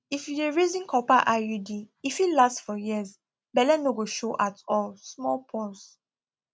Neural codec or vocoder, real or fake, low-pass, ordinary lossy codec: none; real; none; none